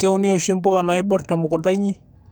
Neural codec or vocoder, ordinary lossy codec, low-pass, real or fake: codec, 44.1 kHz, 2.6 kbps, SNAC; none; none; fake